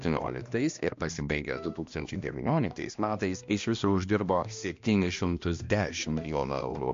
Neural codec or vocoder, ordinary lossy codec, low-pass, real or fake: codec, 16 kHz, 1 kbps, X-Codec, HuBERT features, trained on balanced general audio; MP3, 48 kbps; 7.2 kHz; fake